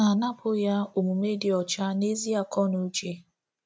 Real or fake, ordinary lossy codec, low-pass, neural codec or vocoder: real; none; none; none